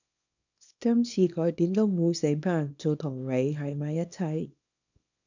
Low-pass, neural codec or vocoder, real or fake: 7.2 kHz; codec, 24 kHz, 0.9 kbps, WavTokenizer, small release; fake